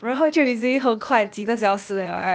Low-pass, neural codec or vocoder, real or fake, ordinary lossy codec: none; codec, 16 kHz, 0.8 kbps, ZipCodec; fake; none